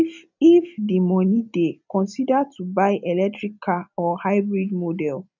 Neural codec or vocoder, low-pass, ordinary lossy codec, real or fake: none; 7.2 kHz; none; real